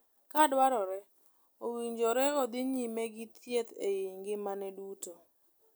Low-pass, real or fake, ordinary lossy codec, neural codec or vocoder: none; real; none; none